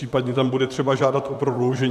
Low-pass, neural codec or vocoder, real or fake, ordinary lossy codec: 14.4 kHz; vocoder, 44.1 kHz, 128 mel bands, Pupu-Vocoder; fake; AAC, 96 kbps